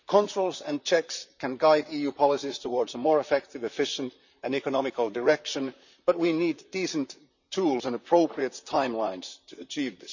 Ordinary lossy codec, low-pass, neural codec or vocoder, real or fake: none; 7.2 kHz; vocoder, 44.1 kHz, 128 mel bands, Pupu-Vocoder; fake